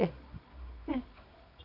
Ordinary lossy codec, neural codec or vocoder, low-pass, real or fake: none; codec, 24 kHz, 0.9 kbps, WavTokenizer, medium music audio release; 5.4 kHz; fake